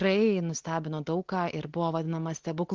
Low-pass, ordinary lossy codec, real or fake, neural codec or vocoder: 7.2 kHz; Opus, 16 kbps; real; none